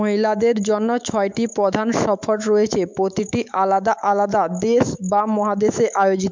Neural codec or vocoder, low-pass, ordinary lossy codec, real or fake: autoencoder, 48 kHz, 128 numbers a frame, DAC-VAE, trained on Japanese speech; 7.2 kHz; MP3, 64 kbps; fake